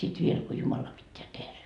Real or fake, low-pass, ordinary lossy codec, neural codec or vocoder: fake; 10.8 kHz; none; vocoder, 44.1 kHz, 128 mel bands every 256 samples, BigVGAN v2